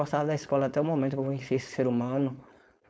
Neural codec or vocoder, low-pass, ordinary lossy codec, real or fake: codec, 16 kHz, 4.8 kbps, FACodec; none; none; fake